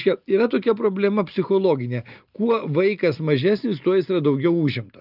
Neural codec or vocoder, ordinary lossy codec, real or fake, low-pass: none; Opus, 24 kbps; real; 5.4 kHz